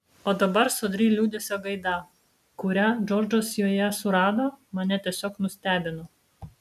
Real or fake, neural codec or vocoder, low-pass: real; none; 14.4 kHz